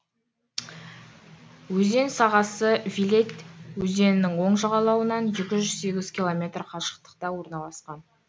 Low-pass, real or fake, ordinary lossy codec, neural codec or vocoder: none; real; none; none